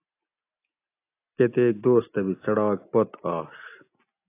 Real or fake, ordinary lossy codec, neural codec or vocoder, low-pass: real; AAC, 24 kbps; none; 3.6 kHz